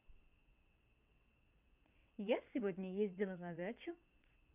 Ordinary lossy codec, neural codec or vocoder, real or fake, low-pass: none; vocoder, 22.05 kHz, 80 mel bands, WaveNeXt; fake; 3.6 kHz